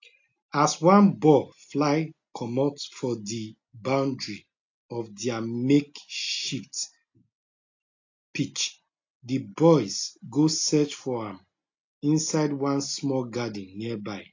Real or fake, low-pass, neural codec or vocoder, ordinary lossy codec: real; 7.2 kHz; none; AAC, 48 kbps